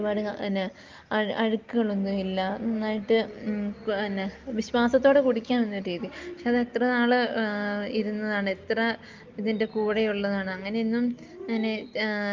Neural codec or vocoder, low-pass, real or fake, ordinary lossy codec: none; 7.2 kHz; real; Opus, 32 kbps